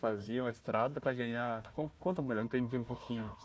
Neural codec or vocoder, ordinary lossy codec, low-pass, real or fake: codec, 16 kHz, 1 kbps, FunCodec, trained on Chinese and English, 50 frames a second; none; none; fake